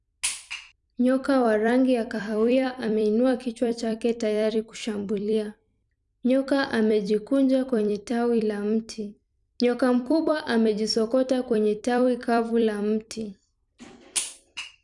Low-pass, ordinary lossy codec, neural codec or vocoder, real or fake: 10.8 kHz; none; vocoder, 44.1 kHz, 128 mel bands every 256 samples, BigVGAN v2; fake